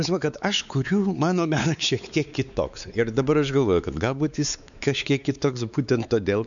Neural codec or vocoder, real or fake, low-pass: codec, 16 kHz, 4 kbps, X-Codec, WavLM features, trained on Multilingual LibriSpeech; fake; 7.2 kHz